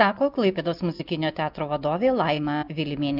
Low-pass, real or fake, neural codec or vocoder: 5.4 kHz; real; none